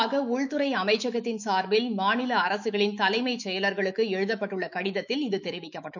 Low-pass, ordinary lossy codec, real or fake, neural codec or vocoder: 7.2 kHz; none; fake; autoencoder, 48 kHz, 128 numbers a frame, DAC-VAE, trained on Japanese speech